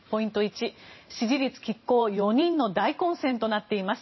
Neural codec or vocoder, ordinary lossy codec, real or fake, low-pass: vocoder, 44.1 kHz, 128 mel bands every 512 samples, BigVGAN v2; MP3, 24 kbps; fake; 7.2 kHz